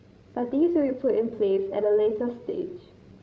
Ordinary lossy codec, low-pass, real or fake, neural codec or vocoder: none; none; fake; codec, 16 kHz, 16 kbps, FreqCodec, larger model